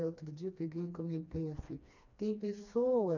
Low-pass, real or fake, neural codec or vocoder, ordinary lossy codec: 7.2 kHz; fake; codec, 16 kHz, 2 kbps, FreqCodec, smaller model; none